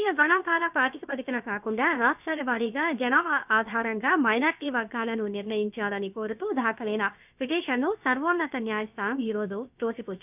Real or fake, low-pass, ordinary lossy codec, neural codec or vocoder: fake; 3.6 kHz; none; codec, 16 kHz, about 1 kbps, DyCAST, with the encoder's durations